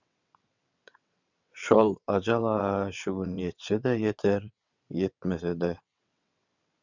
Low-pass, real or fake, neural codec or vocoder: 7.2 kHz; fake; vocoder, 22.05 kHz, 80 mel bands, WaveNeXt